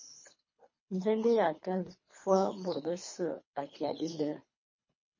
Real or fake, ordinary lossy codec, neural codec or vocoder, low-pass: fake; MP3, 32 kbps; codec, 24 kHz, 3 kbps, HILCodec; 7.2 kHz